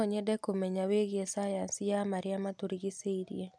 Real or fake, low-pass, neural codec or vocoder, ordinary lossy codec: real; none; none; none